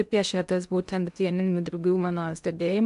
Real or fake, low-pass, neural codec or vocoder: fake; 10.8 kHz; codec, 16 kHz in and 24 kHz out, 0.6 kbps, FocalCodec, streaming, 2048 codes